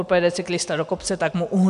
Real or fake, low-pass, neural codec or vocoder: real; 10.8 kHz; none